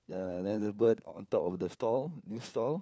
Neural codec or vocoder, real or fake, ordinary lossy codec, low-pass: codec, 16 kHz, 4 kbps, FunCodec, trained on LibriTTS, 50 frames a second; fake; none; none